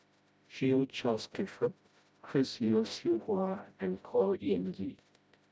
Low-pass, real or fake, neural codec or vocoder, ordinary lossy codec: none; fake; codec, 16 kHz, 0.5 kbps, FreqCodec, smaller model; none